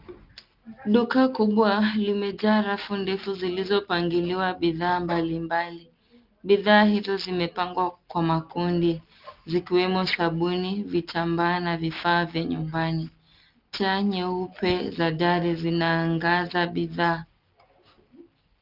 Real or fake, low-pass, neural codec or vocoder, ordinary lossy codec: real; 5.4 kHz; none; Opus, 24 kbps